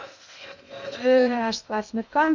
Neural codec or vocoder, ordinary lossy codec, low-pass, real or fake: codec, 16 kHz in and 24 kHz out, 0.6 kbps, FocalCodec, streaming, 2048 codes; none; 7.2 kHz; fake